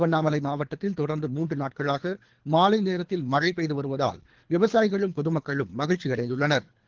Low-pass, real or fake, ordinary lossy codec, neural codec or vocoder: 7.2 kHz; fake; Opus, 16 kbps; codec, 24 kHz, 3 kbps, HILCodec